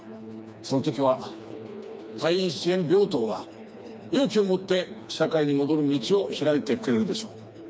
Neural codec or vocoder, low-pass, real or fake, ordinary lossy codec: codec, 16 kHz, 2 kbps, FreqCodec, smaller model; none; fake; none